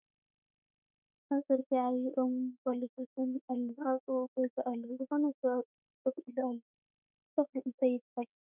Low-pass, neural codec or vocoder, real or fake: 3.6 kHz; autoencoder, 48 kHz, 32 numbers a frame, DAC-VAE, trained on Japanese speech; fake